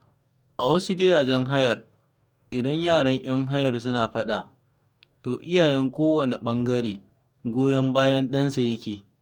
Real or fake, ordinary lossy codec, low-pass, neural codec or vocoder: fake; MP3, 96 kbps; 19.8 kHz; codec, 44.1 kHz, 2.6 kbps, DAC